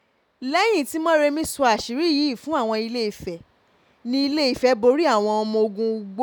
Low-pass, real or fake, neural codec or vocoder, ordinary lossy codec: 19.8 kHz; real; none; none